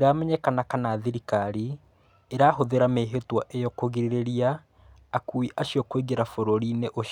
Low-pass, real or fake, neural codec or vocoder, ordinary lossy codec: 19.8 kHz; real; none; none